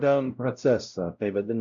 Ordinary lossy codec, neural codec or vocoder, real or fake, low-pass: MP3, 96 kbps; codec, 16 kHz, 0.5 kbps, X-Codec, WavLM features, trained on Multilingual LibriSpeech; fake; 7.2 kHz